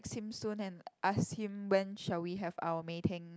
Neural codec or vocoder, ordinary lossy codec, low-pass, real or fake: none; none; none; real